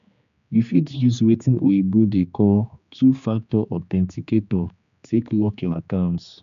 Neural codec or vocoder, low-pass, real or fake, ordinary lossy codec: codec, 16 kHz, 2 kbps, X-Codec, HuBERT features, trained on general audio; 7.2 kHz; fake; none